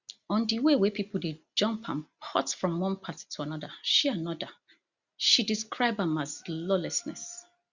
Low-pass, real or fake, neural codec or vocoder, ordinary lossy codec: 7.2 kHz; real; none; Opus, 64 kbps